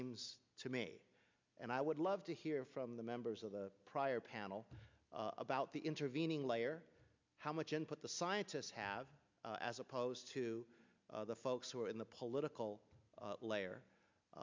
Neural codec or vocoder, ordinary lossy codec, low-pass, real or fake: none; MP3, 64 kbps; 7.2 kHz; real